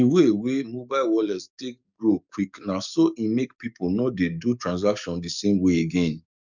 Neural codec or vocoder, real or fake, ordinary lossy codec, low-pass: codec, 44.1 kHz, 7.8 kbps, DAC; fake; none; 7.2 kHz